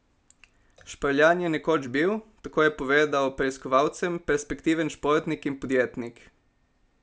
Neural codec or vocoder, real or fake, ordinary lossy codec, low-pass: none; real; none; none